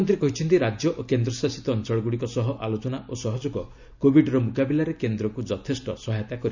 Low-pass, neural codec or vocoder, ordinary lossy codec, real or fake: 7.2 kHz; none; none; real